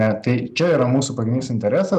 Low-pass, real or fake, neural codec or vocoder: 14.4 kHz; real; none